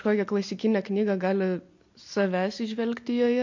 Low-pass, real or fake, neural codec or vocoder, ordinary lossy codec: 7.2 kHz; real; none; MP3, 48 kbps